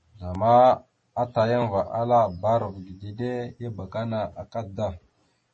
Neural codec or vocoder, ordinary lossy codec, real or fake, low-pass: none; MP3, 32 kbps; real; 10.8 kHz